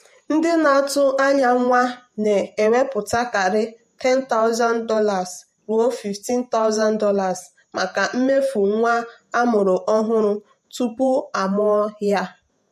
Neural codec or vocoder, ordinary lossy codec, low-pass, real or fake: vocoder, 48 kHz, 128 mel bands, Vocos; MP3, 64 kbps; 14.4 kHz; fake